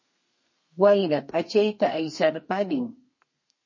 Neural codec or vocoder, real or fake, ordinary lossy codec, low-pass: codec, 32 kHz, 1.9 kbps, SNAC; fake; MP3, 32 kbps; 7.2 kHz